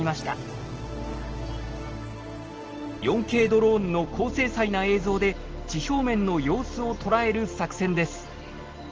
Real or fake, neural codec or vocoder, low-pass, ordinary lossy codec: real; none; 7.2 kHz; Opus, 16 kbps